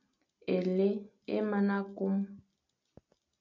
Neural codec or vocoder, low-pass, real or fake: none; 7.2 kHz; real